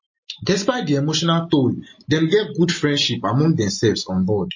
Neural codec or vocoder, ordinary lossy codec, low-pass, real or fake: none; MP3, 32 kbps; 7.2 kHz; real